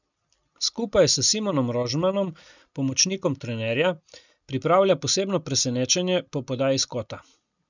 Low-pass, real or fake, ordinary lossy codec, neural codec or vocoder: 7.2 kHz; real; none; none